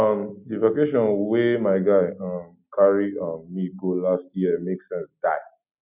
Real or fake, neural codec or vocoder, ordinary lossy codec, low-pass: real; none; none; 3.6 kHz